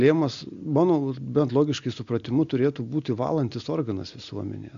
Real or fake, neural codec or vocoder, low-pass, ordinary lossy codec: real; none; 7.2 kHz; MP3, 64 kbps